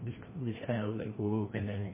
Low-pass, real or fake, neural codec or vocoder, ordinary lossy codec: 3.6 kHz; fake; codec, 16 kHz, 1 kbps, FreqCodec, larger model; MP3, 16 kbps